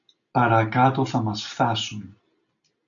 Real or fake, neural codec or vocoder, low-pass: real; none; 7.2 kHz